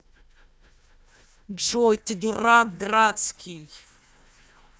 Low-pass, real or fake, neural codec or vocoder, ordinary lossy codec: none; fake; codec, 16 kHz, 1 kbps, FunCodec, trained on Chinese and English, 50 frames a second; none